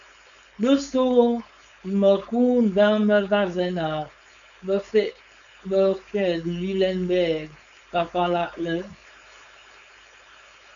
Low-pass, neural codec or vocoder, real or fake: 7.2 kHz; codec, 16 kHz, 4.8 kbps, FACodec; fake